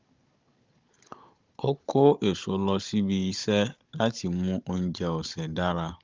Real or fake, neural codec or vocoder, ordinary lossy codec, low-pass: fake; codec, 16 kHz, 16 kbps, FunCodec, trained on Chinese and English, 50 frames a second; Opus, 24 kbps; 7.2 kHz